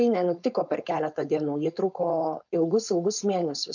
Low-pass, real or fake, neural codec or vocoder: 7.2 kHz; fake; codec, 16 kHz, 4.8 kbps, FACodec